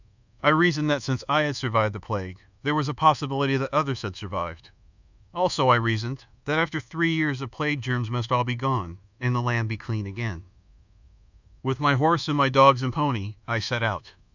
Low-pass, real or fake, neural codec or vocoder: 7.2 kHz; fake; codec, 24 kHz, 1.2 kbps, DualCodec